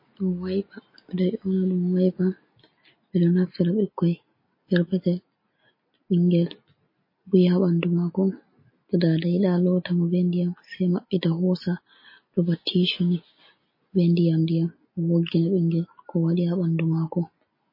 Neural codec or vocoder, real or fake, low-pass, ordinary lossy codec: none; real; 5.4 kHz; MP3, 24 kbps